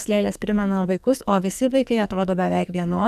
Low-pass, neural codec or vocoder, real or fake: 14.4 kHz; codec, 44.1 kHz, 2.6 kbps, SNAC; fake